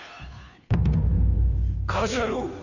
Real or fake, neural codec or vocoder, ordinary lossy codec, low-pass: fake; codec, 16 kHz, 2 kbps, FunCodec, trained on Chinese and English, 25 frames a second; none; 7.2 kHz